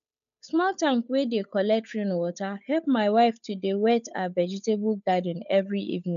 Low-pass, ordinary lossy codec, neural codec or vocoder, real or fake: 7.2 kHz; none; codec, 16 kHz, 8 kbps, FunCodec, trained on Chinese and English, 25 frames a second; fake